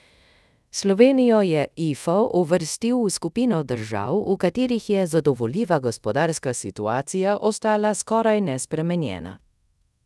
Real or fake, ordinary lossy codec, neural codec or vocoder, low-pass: fake; none; codec, 24 kHz, 0.5 kbps, DualCodec; none